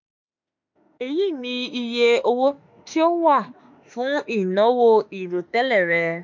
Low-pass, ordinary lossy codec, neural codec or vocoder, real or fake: 7.2 kHz; MP3, 64 kbps; autoencoder, 48 kHz, 32 numbers a frame, DAC-VAE, trained on Japanese speech; fake